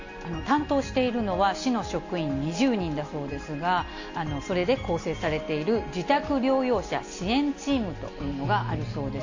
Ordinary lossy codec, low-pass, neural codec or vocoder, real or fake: AAC, 48 kbps; 7.2 kHz; none; real